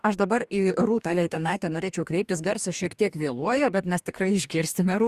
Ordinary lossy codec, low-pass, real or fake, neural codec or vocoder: Opus, 64 kbps; 14.4 kHz; fake; codec, 44.1 kHz, 2.6 kbps, DAC